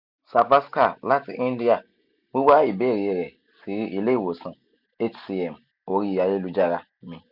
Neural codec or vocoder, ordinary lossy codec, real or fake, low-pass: none; none; real; 5.4 kHz